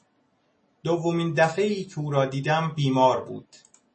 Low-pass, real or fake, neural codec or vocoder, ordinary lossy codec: 10.8 kHz; real; none; MP3, 32 kbps